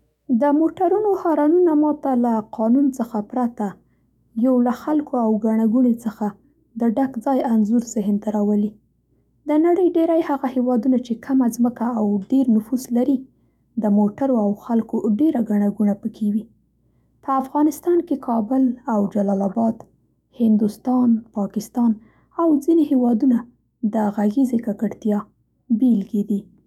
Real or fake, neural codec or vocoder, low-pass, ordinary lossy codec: fake; autoencoder, 48 kHz, 128 numbers a frame, DAC-VAE, trained on Japanese speech; 19.8 kHz; none